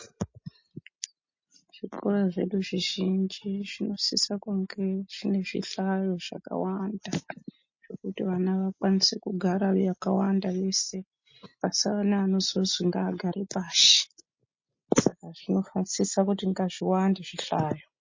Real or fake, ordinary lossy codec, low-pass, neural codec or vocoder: real; MP3, 32 kbps; 7.2 kHz; none